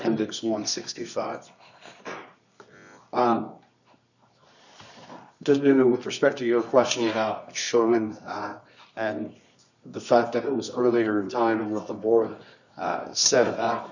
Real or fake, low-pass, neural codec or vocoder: fake; 7.2 kHz; codec, 24 kHz, 0.9 kbps, WavTokenizer, medium music audio release